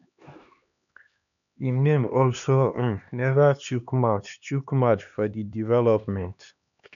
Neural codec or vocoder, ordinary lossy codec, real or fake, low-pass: codec, 16 kHz, 2 kbps, X-Codec, HuBERT features, trained on LibriSpeech; none; fake; 7.2 kHz